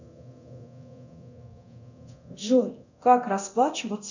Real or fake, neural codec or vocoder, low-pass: fake; codec, 24 kHz, 0.9 kbps, DualCodec; 7.2 kHz